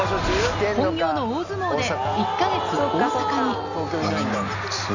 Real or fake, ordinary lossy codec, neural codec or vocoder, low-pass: real; none; none; 7.2 kHz